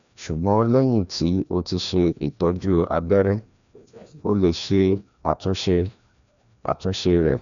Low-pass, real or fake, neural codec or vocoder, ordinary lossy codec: 7.2 kHz; fake; codec, 16 kHz, 1 kbps, FreqCodec, larger model; none